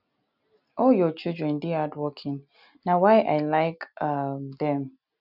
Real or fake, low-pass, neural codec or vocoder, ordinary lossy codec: real; 5.4 kHz; none; none